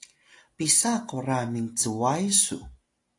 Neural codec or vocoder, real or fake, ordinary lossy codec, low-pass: none; real; AAC, 48 kbps; 10.8 kHz